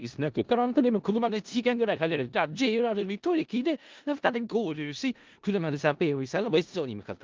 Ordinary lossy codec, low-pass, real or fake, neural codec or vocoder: Opus, 32 kbps; 7.2 kHz; fake; codec, 16 kHz in and 24 kHz out, 0.4 kbps, LongCat-Audio-Codec, four codebook decoder